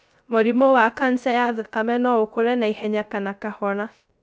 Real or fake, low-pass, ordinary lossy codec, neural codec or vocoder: fake; none; none; codec, 16 kHz, 0.3 kbps, FocalCodec